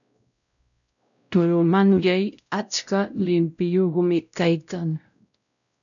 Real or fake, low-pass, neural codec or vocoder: fake; 7.2 kHz; codec, 16 kHz, 0.5 kbps, X-Codec, WavLM features, trained on Multilingual LibriSpeech